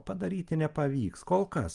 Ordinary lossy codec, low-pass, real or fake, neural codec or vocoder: Opus, 32 kbps; 10.8 kHz; real; none